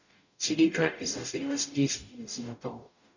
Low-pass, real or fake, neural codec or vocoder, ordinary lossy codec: 7.2 kHz; fake; codec, 44.1 kHz, 0.9 kbps, DAC; none